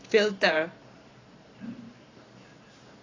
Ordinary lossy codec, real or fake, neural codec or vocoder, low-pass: AAC, 32 kbps; fake; vocoder, 44.1 kHz, 128 mel bands every 512 samples, BigVGAN v2; 7.2 kHz